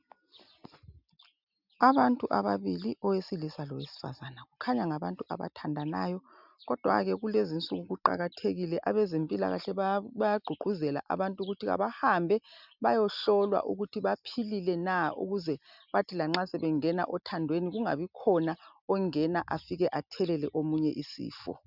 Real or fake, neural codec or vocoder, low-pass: real; none; 5.4 kHz